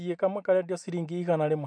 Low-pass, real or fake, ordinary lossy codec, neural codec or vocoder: 9.9 kHz; real; MP3, 64 kbps; none